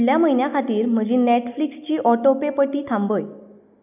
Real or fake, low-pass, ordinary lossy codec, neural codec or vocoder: real; 3.6 kHz; none; none